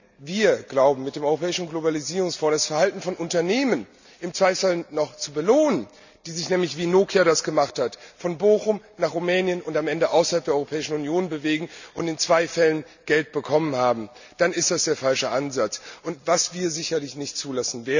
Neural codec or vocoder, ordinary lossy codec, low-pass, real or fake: none; none; 7.2 kHz; real